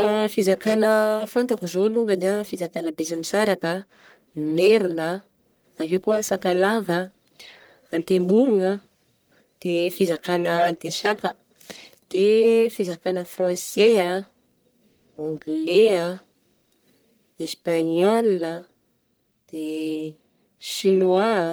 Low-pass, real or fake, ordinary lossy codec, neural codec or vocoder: none; fake; none; codec, 44.1 kHz, 1.7 kbps, Pupu-Codec